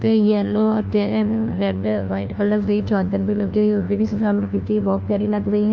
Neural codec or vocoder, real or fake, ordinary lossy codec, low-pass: codec, 16 kHz, 1 kbps, FunCodec, trained on LibriTTS, 50 frames a second; fake; none; none